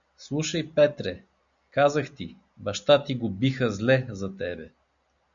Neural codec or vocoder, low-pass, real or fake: none; 7.2 kHz; real